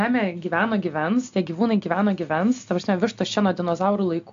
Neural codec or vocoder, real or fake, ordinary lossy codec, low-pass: none; real; AAC, 64 kbps; 7.2 kHz